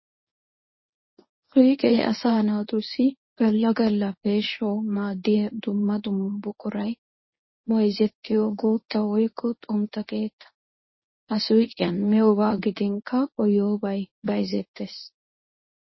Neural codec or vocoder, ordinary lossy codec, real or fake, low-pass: codec, 24 kHz, 0.9 kbps, WavTokenizer, medium speech release version 1; MP3, 24 kbps; fake; 7.2 kHz